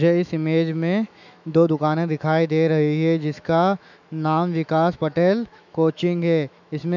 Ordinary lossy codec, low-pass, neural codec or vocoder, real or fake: none; 7.2 kHz; autoencoder, 48 kHz, 128 numbers a frame, DAC-VAE, trained on Japanese speech; fake